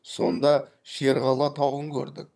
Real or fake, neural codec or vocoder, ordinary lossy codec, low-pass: fake; vocoder, 22.05 kHz, 80 mel bands, HiFi-GAN; none; none